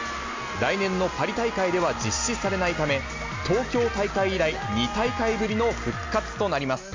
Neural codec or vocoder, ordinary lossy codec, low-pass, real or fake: none; MP3, 64 kbps; 7.2 kHz; real